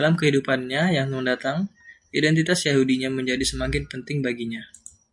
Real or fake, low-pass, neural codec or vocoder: real; 10.8 kHz; none